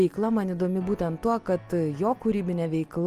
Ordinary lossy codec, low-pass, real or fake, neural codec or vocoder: Opus, 32 kbps; 14.4 kHz; real; none